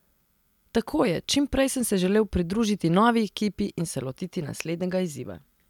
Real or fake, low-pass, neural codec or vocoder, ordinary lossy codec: real; 19.8 kHz; none; none